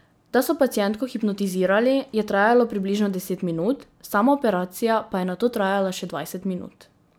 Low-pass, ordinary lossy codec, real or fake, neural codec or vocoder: none; none; real; none